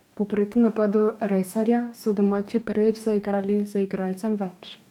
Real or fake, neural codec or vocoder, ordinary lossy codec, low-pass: fake; codec, 44.1 kHz, 2.6 kbps, DAC; none; 19.8 kHz